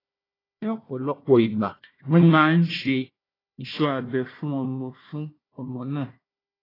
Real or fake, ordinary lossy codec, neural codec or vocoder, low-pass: fake; AAC, 24 kbps; codec, 16 kHz, 1 kbps, FunCodec, trained on Chinese and English, 50 frames a second; 5.4 kHz